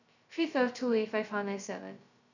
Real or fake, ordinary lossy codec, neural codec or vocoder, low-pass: fake; none; codec, 16 kHz, 0.2 kbps, FocalCodec; 7.2 kHz